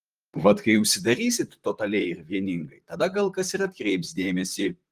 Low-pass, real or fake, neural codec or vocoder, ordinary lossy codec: 14.4 kHz; fake; vocoder, 44.1 kHz, 128 mel bands, Pupu-Vocoder; Opus, 24 kbps